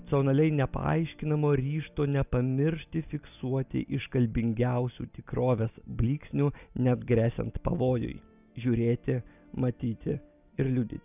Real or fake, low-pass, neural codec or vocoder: real; 3.6 kHz; none